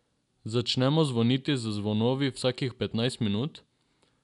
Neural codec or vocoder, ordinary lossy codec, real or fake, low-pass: none; none; real; 10.8 kHz